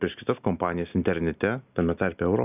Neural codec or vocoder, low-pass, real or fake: none; 3.6 kHz; real